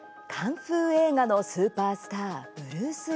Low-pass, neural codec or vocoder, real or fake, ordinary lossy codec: none; none; real; none